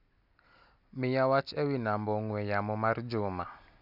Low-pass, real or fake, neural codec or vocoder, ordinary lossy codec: 5.4 kHz; real; none; none